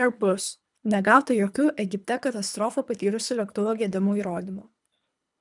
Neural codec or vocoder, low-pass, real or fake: codec, 24 kHz, 3 kbps, HILCodec; 10.8 kHz; fake